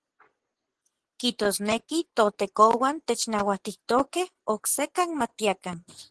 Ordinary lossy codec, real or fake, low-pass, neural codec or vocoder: Opus, 16 kbps; fake; 10.8 kHz; vocoder, 24 kHz, 100 mel bands, Vocos